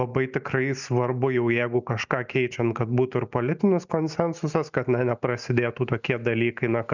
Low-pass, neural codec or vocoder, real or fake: 7.2 kHz; none; real